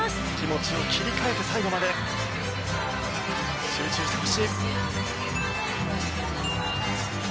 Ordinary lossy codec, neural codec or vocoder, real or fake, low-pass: none; none; real; none